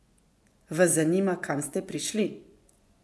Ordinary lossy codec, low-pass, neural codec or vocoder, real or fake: none; none; vocoder, 24 kHz, 100 mel bands, Vocos; fake